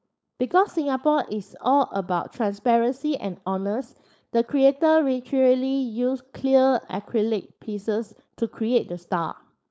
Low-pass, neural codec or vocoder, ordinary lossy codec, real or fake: none; codec, 16 kHz, 4.8 kbps, FACodec; none; fake